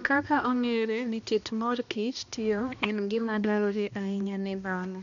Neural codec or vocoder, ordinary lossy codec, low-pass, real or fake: codec, 16 kHz, 1 kbps, X-Codec, HuBERT features, trained on balanced general audio; none; 7.2 kHz; fake